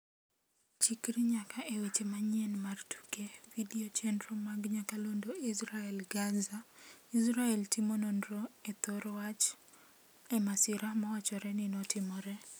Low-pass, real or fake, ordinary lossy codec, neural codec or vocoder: none; real; none; none